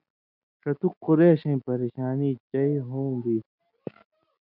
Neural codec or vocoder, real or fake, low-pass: none; real; 5.4 kHz